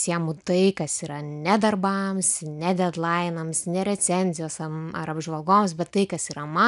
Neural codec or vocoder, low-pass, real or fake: none; 10.8 kHz; real